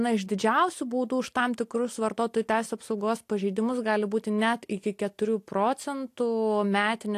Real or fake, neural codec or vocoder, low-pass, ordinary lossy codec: real; none; 14.4 kHz; AAC, 64 kbps